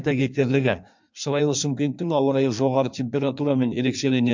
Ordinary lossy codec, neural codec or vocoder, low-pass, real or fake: MP3, 64 kbps; codec, 16 kHz in and 24 kHz out, 1.1 kbps, FireRedTTS-2 codec; 7.2 kHz; fake